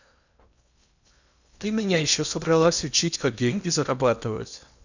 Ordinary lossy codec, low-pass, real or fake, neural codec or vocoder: none; 7.2 kHz; fake; codec, 16 kHz in and 24 kHz out, 0.8 kbps, FocalCodec, streaming, 65536 codes